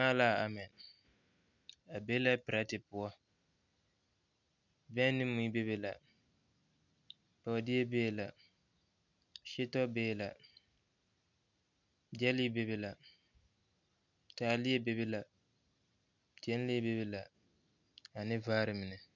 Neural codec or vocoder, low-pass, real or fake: none; 7.2 kHz; real